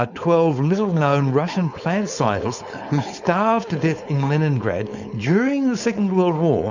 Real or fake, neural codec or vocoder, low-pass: fake; codec, 16 kHz, 4.8 kbps, FACodec; 7.2 kHz